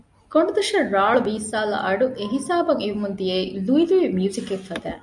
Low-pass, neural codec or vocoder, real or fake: 10.8 kHz; none; real